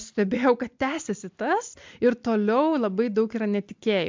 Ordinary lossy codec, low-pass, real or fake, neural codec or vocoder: MP3, 64 kbps; 7.2 kHz; real; none